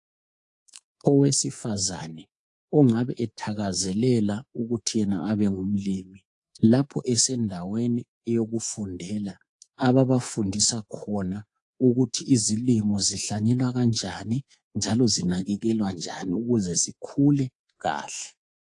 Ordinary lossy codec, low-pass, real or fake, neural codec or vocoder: AAC, 48 kbps; 10.8 kHz; fake; codec, 24 kHz, 3.1 kbps, DualCodec